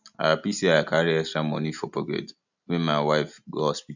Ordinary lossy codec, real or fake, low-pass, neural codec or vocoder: none; real; 7.2 kHz; none